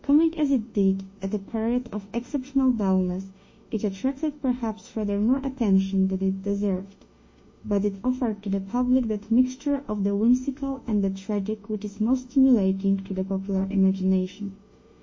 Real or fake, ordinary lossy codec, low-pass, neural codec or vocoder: fake; MP3, 32 kbps; 7.2 kHz; autoencoder, 48 kHz, 32 numbers a frame, DAC-VAE, trained on Japanese speech